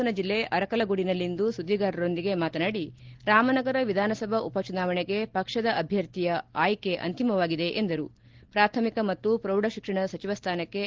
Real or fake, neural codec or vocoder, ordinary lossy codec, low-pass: real; none; Opus, 16 kbps; 7.2 kHz